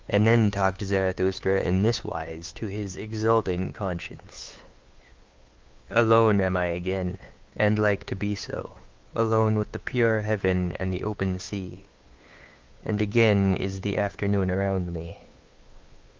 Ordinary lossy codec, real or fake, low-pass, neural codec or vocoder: Opus, 32 kbps; fake; 7.2 kHz; codec, 16 kHz, 2 kbps, FunCodec, trained on Chinese and English, 25 frames a second